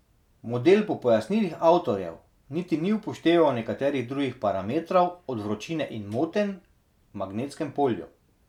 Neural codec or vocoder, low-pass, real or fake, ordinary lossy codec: none; 19.8 kHz; real; none